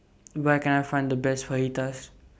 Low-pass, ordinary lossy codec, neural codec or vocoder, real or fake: none; none; none; real